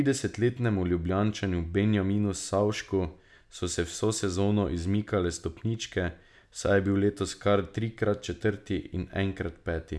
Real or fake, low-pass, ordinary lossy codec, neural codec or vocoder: real; none; none; none